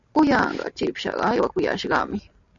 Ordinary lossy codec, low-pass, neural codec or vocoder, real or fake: MP3, 96 kbps; 7.2 kHz; none; real